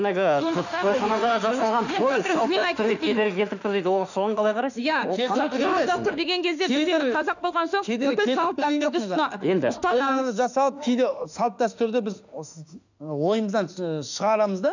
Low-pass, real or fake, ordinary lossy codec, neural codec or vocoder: 7.2 kHz; fake; none; autoencoder, 48 kHz, 32 numbers a frame, DAC-VAE, trained on Japanese speech